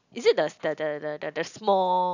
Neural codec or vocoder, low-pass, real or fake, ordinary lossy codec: none; 7.2 kHz; real; none